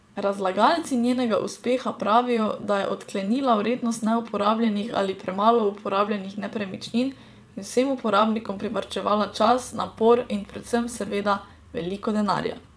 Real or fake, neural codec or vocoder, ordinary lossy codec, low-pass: fake; vocoder, 22.05 kHz, 80 mel bands, WaveNeXt; none; none